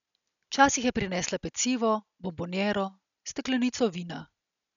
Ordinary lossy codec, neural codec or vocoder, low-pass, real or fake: none; none; 7.2 kHz; real